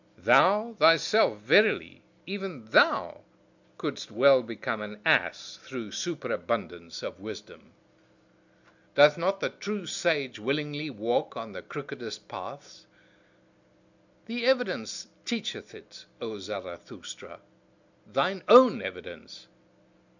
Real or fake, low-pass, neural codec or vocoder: real; 7.2 kHz; none